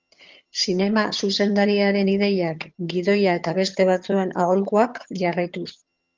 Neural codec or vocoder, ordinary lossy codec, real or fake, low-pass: vocoder, 22.05 kHz, 80 mel bands, HiFi-GAN; Opus, 32 kbps; fake; 7.2 kHz